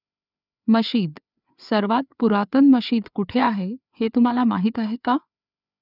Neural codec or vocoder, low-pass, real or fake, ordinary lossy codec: codec, 16 kHz, 4 kbps, FreqCodec, larger model; 5.4 kHz; fake; none